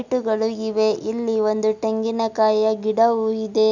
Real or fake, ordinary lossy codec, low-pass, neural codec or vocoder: real; none; 7.2 kHz; none